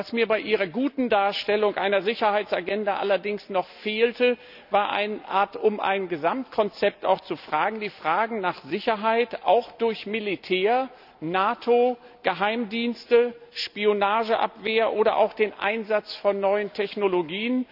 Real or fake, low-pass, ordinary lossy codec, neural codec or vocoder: real; 5.4 kHz; none; none